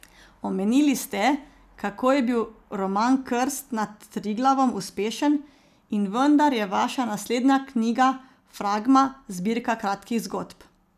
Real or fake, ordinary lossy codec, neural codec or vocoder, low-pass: real; none; none; 14.4 kHz